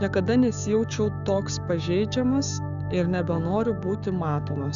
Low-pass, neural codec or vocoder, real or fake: 7.2 kHz; codec, 16 kHz, 6 kbps, DAC; fake